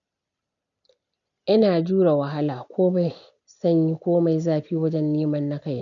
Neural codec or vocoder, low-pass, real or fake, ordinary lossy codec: none; 7.2 kHz; real; none